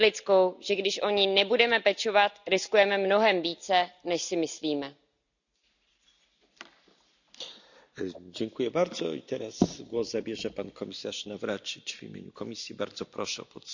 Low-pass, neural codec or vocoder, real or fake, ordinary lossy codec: 7.2 kHz; none; real; none